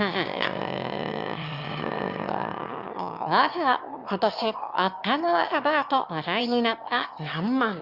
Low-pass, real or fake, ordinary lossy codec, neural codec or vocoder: 5.4 kHz; fake; Opus, 64 kbps; autoencoder, 22.05 kHz, a latent of 192 numbers a frame, VITS, trained on one speaker